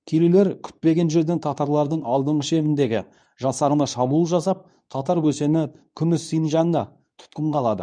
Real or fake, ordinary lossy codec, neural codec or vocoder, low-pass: fake; none; codec, 24 kHz, 0.9 kbps, WavTokenizer, medium speech release version 2; 9.9 kHz